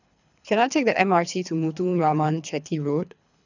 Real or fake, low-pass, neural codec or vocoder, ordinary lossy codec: fake; 7.2 kHz; codec, 24 kHz, 3 kbps, HILCodec; none